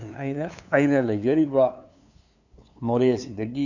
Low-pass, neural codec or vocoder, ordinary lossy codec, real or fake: 7.2 kHz; codec, 16 kHz, 2 kbps, FunCodec, trained on LibriTTS, 25 frames a second; none; fake